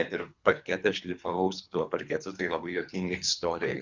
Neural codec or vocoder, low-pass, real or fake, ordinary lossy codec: codec, 24 kHz, 3 kbps, HILCodec; 7.2 kHz; fake; Opus, 64 kbps